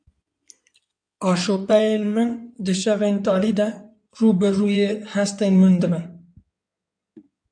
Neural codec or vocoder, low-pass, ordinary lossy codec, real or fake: codec, 16 kHz in and 24 kHz out, 2.2 kbps, FireRedTTS-2 codec; 9.9 kHz; MP3, 64 kbps; fake